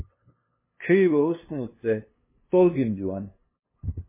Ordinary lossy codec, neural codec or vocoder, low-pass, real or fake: MP3, 16 kbps; codec, 16 kHz, 2 kbps, FunCodec, trained on LibriTTS, 25 frames a second; 3.6 kHz; fake